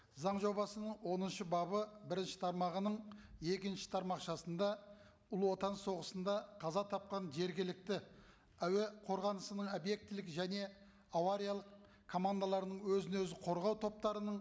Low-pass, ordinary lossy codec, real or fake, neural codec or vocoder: none; none; real; none